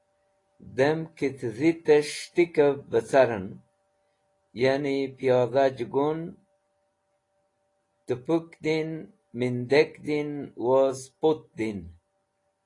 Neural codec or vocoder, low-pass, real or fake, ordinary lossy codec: none; 10.8 kHz; real; AAC, 32 kbps